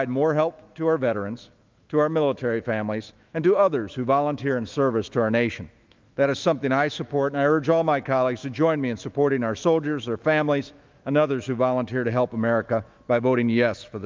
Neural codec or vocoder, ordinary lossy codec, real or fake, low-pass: autoencoder, 48 kHz, 128 numbers a frame, DAC-VAE, trained on Japanese speech; Opus, 24 kbps; fake; 7.2 kHz